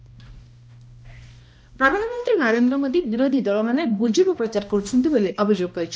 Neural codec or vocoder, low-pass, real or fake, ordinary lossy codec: codec, 16 kHz, 1 kbps, X-Codec, HuBERT features, trained on balanced general audio; none; fake; none